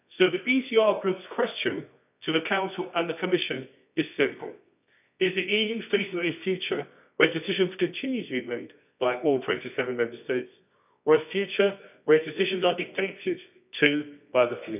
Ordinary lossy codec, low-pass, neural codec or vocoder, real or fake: none; 3.6 kHz; codec, 24 kHz, 0.9 kbps, WavTokenizer, medium music audio release; fake